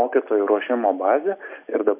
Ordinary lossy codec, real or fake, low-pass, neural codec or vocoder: MP3, 24 kbps; real; 3.6 kHz; none